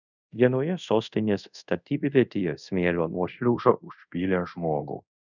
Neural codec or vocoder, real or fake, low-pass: codec, 24 kHz, 0.5 kbps, DualCodec; fake; 7.2 kHz